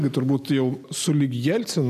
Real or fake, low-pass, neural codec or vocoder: real; 14.4 kHz; none